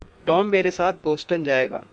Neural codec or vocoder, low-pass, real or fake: codec, 32 kHz, 1.9 kbps, SNAC; 9.9 kHz; fake